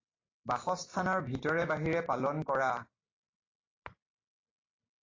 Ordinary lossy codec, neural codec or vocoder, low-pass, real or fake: AAC, 32 kbps; none; 7.2 kHz; real